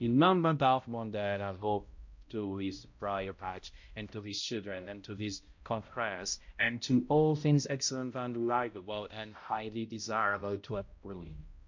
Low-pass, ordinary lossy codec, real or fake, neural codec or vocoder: 7.2 kHz; MP3, 48 kbps; fake; codec, 16 kHz, 0.5 kbps, X-Codec, HuBERT features, trained on balanced general audio